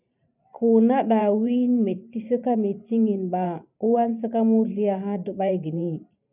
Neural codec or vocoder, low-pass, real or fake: vocoder, 44.1 kHz, 128 mel bands every 512 samples, BigVGAN v2; 3.6 kHz; fake